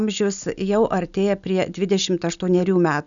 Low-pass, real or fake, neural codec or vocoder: 7.2 kHz; real; none